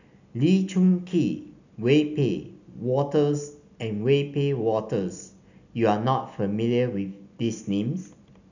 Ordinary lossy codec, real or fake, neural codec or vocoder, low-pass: none; real; none; 7.2 kHz